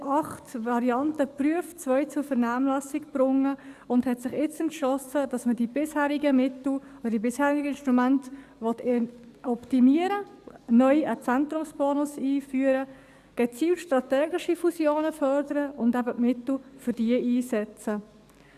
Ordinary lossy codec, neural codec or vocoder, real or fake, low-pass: Opus, 64 kbps; codec, 44.1 kHz, 7.8 kbps, DAC; fake; 14.4 kHz